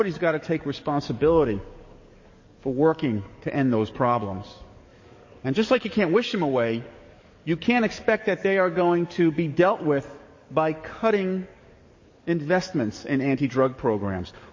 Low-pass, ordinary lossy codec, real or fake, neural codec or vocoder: 7.2 kHz; MP3, 32 kbps; fake; codec, 44.1 kHz, 7.8 kbps, DAC